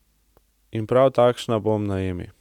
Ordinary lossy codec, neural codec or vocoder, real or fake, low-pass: none; none; real; 19.8 kHz